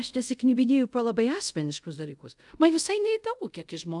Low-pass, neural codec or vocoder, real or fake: 10.8 kHz; codec, 24 kHz, 0.5 kbps, DualCodec; fake